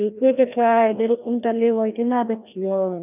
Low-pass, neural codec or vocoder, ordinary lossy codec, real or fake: 3.6 kHz; codec, 16 kHz, 1 kbps, FreqCodec, larger model; none; fake